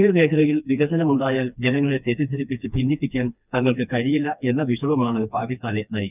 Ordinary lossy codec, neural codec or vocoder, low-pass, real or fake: none; codec, 16 kHz, 2 kbps, FreqCodec, smaller model; 3.6 kHz; fake